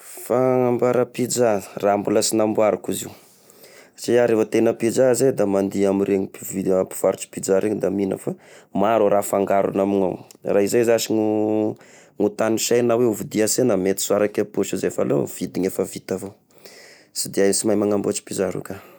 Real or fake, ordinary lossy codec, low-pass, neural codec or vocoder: real; none; none; none